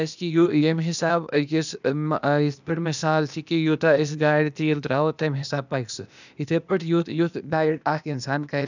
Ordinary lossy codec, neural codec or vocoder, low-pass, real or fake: none; codec, 16 kHz, 0.8 kbps, ZipCodec; 7.2 kHz; fake